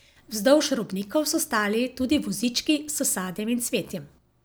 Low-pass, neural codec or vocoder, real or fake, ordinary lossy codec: none; none; real; none